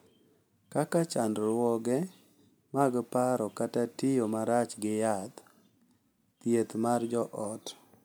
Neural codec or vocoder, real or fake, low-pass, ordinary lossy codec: none; real; none; none